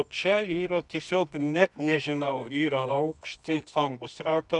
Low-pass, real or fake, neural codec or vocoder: 10.8 kHz; fake; codec, 24 kHz, 0.9 kbps, WavTokenizer, medium music audio release